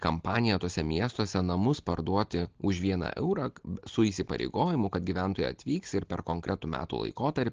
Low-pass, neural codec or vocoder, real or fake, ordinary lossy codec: 7.2 kHz; none; real; Opus, 16 kbps